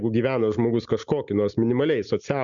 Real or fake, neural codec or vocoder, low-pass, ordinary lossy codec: real; none; 7.2 kHz; MP3, 96 kbps